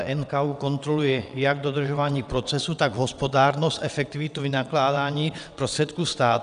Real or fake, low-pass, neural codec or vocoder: fake; 9.9 kHz; vocoder, 22.05 kHz, 80 mel bands, WaveNeXt